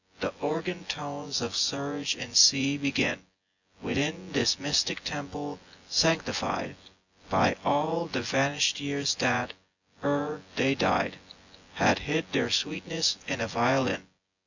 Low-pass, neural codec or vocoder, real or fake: 7.2 kHz; vocoder, 24 kHz, 100 mel bands, Vocos; fake